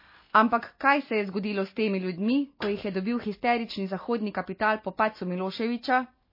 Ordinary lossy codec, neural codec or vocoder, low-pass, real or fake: MP3, 24 kbps; none; 5.4 kHz; real